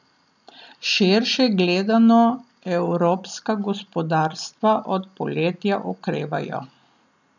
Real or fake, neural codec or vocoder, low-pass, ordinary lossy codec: real; none; 7.2 kHz; none